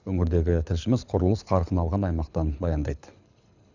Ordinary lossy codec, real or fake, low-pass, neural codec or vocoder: none; fake; 7.2 kHz; codec, 24 kHz, 6 kbps, HILCodec